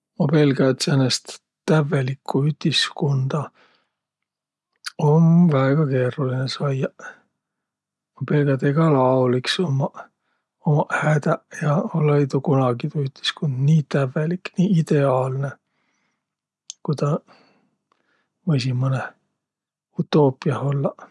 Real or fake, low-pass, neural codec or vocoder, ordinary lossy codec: real; none; none; none